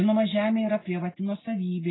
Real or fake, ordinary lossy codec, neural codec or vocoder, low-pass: real; AAC, 16 kbps; none; 7.2 kHz